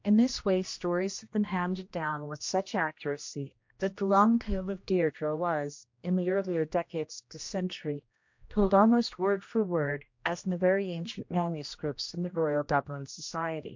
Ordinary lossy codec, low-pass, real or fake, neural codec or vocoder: MP3, 48 kbps; 7.2 kHz; fake; codec, 16 kHz, 1 kbps, X-Codec, HuBERT features, trained on general audio